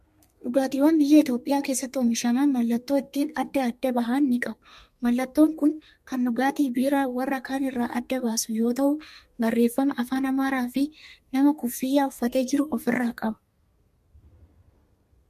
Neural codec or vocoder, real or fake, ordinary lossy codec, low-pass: codec, 32 kHz, 1.9 kbps, SNAC; fake; MP3, 64 kbps; 14.4 kHz